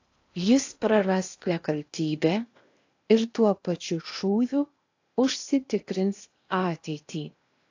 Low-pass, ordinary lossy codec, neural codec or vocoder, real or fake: 7.2 kHz; AAC, 48 kbps; codec, 16 kHz in and 24 kHz out, 0.8 kbps, FocalCodec, streaming, 65536 codes; fake